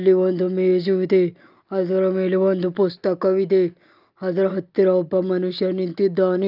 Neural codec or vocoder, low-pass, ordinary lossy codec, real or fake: none; 5.4 kHz; Opus, 24 kbps; real